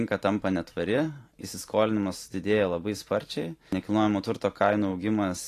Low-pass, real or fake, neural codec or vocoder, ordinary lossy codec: 14.4 kHz; fake; vocoder, 44.1 kHz, 128 mel bands every 512 samples, BigVGAN v2; AAC, 48 kbps